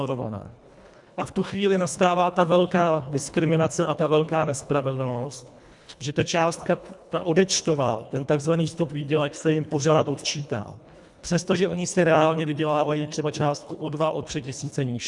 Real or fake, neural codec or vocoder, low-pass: fake; codec, 24 kHz, 1.5 kbps, HILCodec; 10.8 kHz